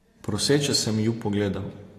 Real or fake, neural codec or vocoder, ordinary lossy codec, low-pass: real; none; AAC, 48 kbps; 14.4 kHz